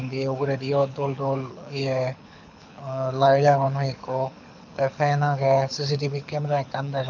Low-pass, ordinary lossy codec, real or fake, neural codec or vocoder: 7.2 kHz; none; fake; codec, 24 kHz, 6 kbps, HILCodec